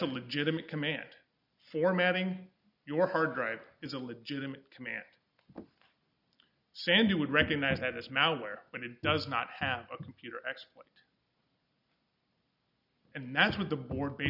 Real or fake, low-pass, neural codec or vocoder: real; 5.4 kHz; none